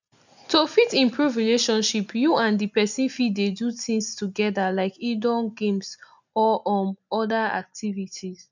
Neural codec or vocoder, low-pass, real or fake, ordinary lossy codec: none; 7.2 kHz; real; none